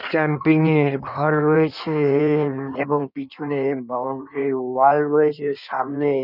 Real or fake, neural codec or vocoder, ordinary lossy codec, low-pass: fake; codec, 16 kHz in and 24 kHz out, 1.1 kbps, FireRedTTS-2 codec; none; 5.4 kHz